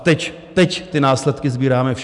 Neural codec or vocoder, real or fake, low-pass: none; real; 10.8 kHz